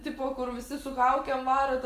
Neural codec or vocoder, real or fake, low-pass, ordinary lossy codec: none; real; 14.4 kHz; Opus, 32 kbps